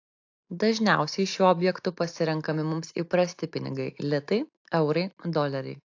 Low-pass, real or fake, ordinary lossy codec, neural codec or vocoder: 7.2 kHz; real; AAC, 48 kbps; none